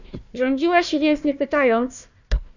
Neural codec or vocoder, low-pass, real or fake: codec, 16 kHz, 1 kbps, FunCodec, trained on Chinese and English, 50 frames a second; 7.2 kHz; fake